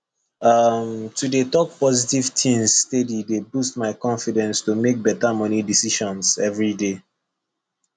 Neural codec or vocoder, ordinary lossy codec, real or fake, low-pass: none; none; real; 9.9 kHz